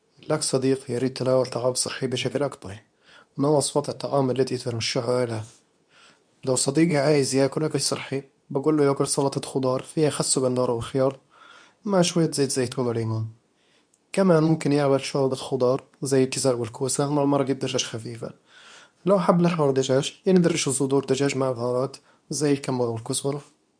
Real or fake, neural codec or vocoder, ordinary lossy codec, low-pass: fake; codec, 24 kHz, 0.9 kbps, WavTokenizer, medium speech release version 2; none; 9.9 kHz